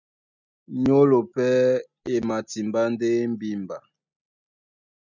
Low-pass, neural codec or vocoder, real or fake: 7.2 kHz; none; real